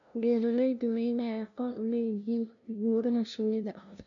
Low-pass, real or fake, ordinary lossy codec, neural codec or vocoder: 7.2 kHz; fake; none; codec, 16 kHz, 0.5 kbps, FunCodec, trained on LibriTTS, 25 frames a second